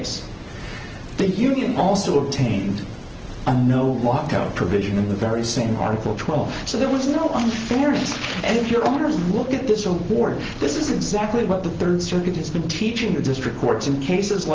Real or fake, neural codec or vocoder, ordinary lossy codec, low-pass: real; none; Opus, 16 kbps; 7.2 kHz